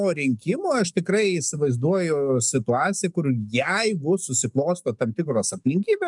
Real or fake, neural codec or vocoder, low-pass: fake; codec, 44.1 kHz, 7.8 kbps, DAC; 10.8 kHz